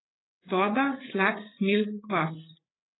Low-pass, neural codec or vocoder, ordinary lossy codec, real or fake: 7.2 kHz; codec, 16 kHz, 8 kbps, FreqCodec, larger model; AAC, 16 kbps; fake